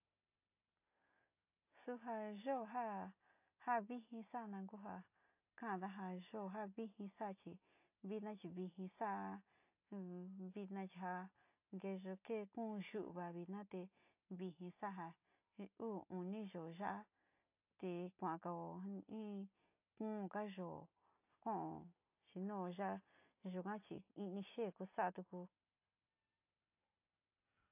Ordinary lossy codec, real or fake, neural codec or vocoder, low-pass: AAC, 32 kbps; real; none; 3.6 kHz